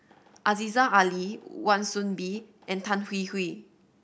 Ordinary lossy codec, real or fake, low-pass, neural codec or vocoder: none; real; none; none